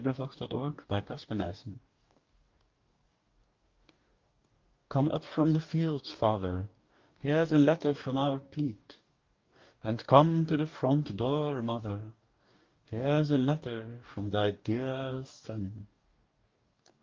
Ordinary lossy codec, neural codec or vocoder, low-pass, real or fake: Opus, 32 kbps; codec, 44.1 kHz, 2.6 kbps, DAC; 7.2 kHz; fake